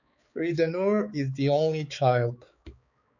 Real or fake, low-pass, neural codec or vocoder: fake; 7.2 kHz; codec, 16 kHz, 4 kbps, X-Codec, HuBERT features, trained on balanced general audio